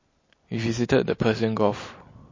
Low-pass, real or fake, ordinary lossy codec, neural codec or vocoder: 7.2 kHz; real; MP3, 32 kbps; none